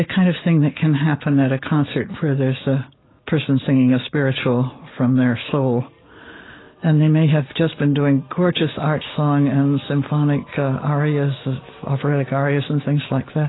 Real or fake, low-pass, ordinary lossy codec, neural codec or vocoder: real; 7.2 kHz; AAC, 16 kbps; none